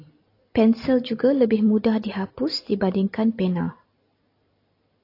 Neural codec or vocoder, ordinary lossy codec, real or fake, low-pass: none; AAC, 32 kbps; real; 5.4 kHz